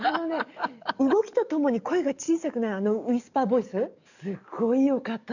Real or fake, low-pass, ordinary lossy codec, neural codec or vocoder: fake; 7.2 kHz; none; codec, 44.1 kHz, 7.8 kbps, DAC